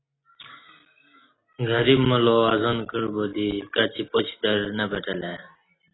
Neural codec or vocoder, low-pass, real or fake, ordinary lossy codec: none; 7.2 kHz; real; AAC, 16 kbps